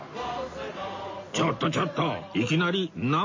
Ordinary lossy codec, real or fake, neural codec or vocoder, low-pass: MP3, 32 kbps; real; none; 7.2 kHz